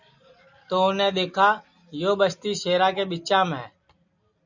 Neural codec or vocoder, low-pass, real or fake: none; 7.2 kHz; real